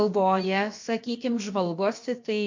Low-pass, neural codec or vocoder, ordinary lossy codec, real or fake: 7.2 kHz; codec, 16 kHz, about 1 kbps, DyCAST, with the encoder's durations; MP3, 48 kbps; fake